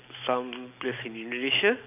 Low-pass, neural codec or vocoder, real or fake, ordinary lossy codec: 3.6 kHz; none; real; none